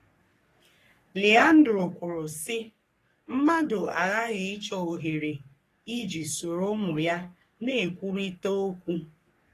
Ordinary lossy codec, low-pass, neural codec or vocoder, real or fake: AAC, 48 kbps; 14.4 kHz; codec, 44.1 kHz, 3.4 kbps, Pupu-Codec; fake